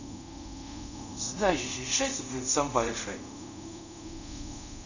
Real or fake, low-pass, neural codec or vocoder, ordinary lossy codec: fake; 7.2 kHz; codec, 24 kHz, 0.5 kbps, DualCodec; none